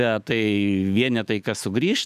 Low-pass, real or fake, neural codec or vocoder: 14.4 kHz; fake; autoencoder, 48 kHz, 128 numbers a frame, DAC-VAE, trained on Japanese speech